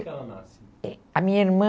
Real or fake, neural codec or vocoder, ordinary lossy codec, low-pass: real; none; none; none